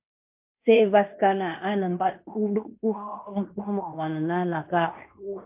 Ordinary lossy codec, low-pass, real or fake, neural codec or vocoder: MP3, 32 kbps; 3.6 kHz; fake; codec, 16 kHz in and 24 kHz out, 0.9 kbps, LongCat-Audio-Codec, fine tuned four codebook decoder